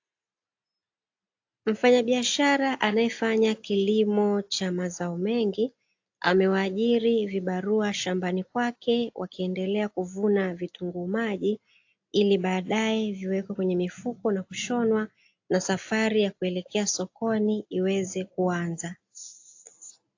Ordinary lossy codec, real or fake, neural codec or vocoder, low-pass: AAC, 48 kbps; real; none; 7.2 kHz